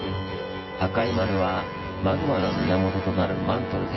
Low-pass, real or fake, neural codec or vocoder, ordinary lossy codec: 7.2 kHz; fake; vocoder, 24 kHz, 100 mel bands, Vocos; MP3, 24 kbps